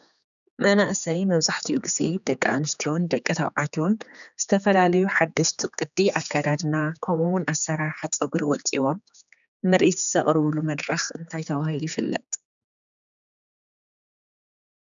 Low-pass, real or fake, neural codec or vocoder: 7.2 kHz; fake; codec, 16 kHz, 4 kbps, X-Codec, HuBERT features, trained on general audio